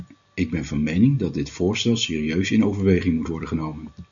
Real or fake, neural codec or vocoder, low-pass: real; none; 7.2 kHz